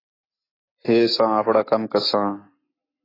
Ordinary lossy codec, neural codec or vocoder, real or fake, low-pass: AAC, 24 kbps; none; real; 5.4 kHz